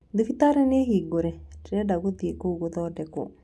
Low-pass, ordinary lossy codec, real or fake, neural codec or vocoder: none; none; real; none